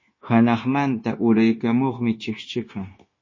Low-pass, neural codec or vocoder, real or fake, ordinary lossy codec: 7.2 kHz; codec, 16 kHz, 0.9 kbps, LongCat-Audio-Codec; fake; MP3, 32 kbps